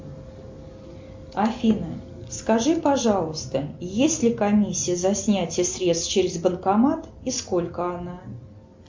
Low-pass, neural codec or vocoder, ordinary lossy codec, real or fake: 7.2 kHz; none; MP3, 48 kbps; real